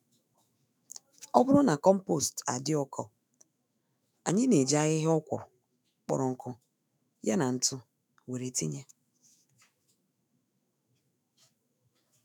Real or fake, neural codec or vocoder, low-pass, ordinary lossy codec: fake; autoencoder, 48 kHz, 128 numbers a frame, DAC-VAE, trained on Japanese speech; none; none